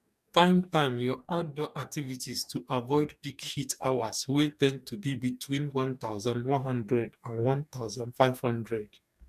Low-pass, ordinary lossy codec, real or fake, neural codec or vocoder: 14.4 kHz; none; fake; codec, 44.1 kHz, 2.6 kbps, DAC